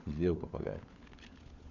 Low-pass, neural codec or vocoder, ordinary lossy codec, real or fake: 7.2 kHz; codec, 16 kHz, 16 kbps, FunCodec, trained on LibriTTS, 50 frames a second; none; fake